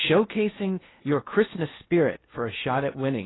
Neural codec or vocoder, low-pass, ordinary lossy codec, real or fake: codec, 16 kHz in and 24 kHz out, 0.6 kbps, FocalCodec, streaming, 4096 codes; 7.2 kHz; AAC, 16 kbps; fake